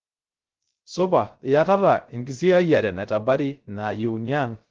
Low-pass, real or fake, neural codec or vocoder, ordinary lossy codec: 7.2 kHz; fake; codec, 16 kHz, 0.3 kbps, FocalCodec; Opus, 16 kbps